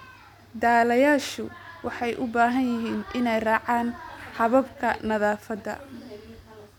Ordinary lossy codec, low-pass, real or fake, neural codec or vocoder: none; 19.8 kHz; real; none